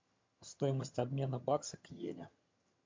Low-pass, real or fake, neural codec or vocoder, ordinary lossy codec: 7.2 kHz; fake; vocoder, 22.05 kHz, 80 mel bands, HiFi-GAN; MP3, 48 kbps